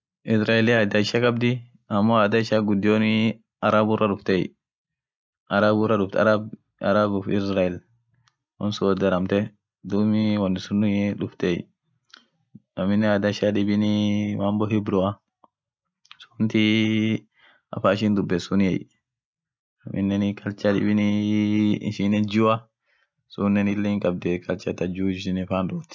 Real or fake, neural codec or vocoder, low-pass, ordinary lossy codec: real; none; none; none